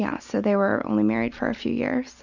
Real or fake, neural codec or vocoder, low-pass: real; none; 7.2 kHz